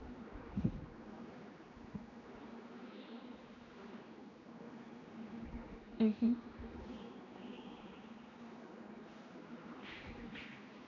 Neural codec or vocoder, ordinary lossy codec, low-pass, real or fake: codec, 16 kHz, 2 kbps, X-Codec, HuBERT features, trained on general audio; none; 7.2 kHz; fake